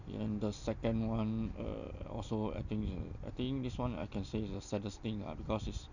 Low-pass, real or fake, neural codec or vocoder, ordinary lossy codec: 7.2 kHz; real; none; none